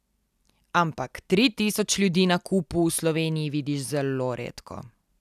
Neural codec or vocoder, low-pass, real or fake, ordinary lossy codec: none; 14.4 kHz; real; none